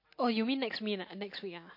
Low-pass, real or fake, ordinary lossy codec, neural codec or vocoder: 5.4 kHz; real; MP3, 32 kbps; none